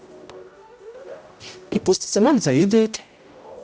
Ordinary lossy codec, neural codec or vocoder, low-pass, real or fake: none; codec, 16 kHz, 0.5 kbps, X-Codec, HuBERT features, trained on general audio; none; fake